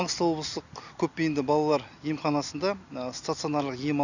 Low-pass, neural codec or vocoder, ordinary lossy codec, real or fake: 7.2 kHz; none; none; real